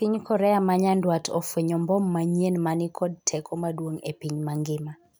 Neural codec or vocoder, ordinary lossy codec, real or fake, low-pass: none; none; real; none